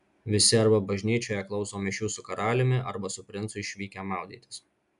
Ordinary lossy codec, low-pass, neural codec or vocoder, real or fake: MP3, 96 kbps; 10.8 kHz; none; real